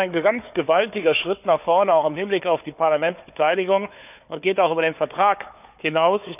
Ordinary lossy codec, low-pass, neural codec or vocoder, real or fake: none; 3.6 kHz; codec, 16 kHz, 4 kbps, FunCodec, trained on Chinese and English, 50 frames a second; fake